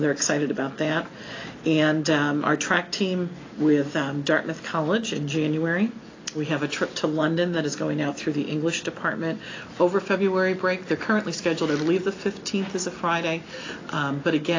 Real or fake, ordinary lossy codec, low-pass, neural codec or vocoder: real; AAC, 32 kbps; 7.2 kHz; none